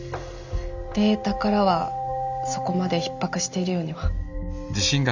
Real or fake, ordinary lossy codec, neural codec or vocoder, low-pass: real; none; none; 7.2 kHz